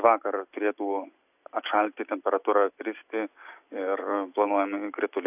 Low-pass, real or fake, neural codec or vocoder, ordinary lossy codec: 3.6 kHz; real; none; AAC, 32 kbps